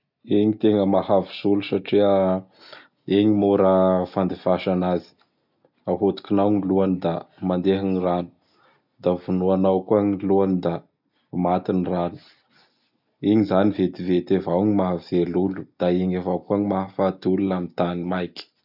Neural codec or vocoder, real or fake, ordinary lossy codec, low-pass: none; real; none; 5.4 kHz